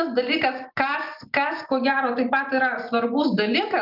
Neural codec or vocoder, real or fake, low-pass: none; real; 5.4 kHz